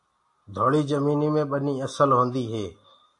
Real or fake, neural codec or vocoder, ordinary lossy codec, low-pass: real; none; AAC, 64 kbps; 10.8 kHz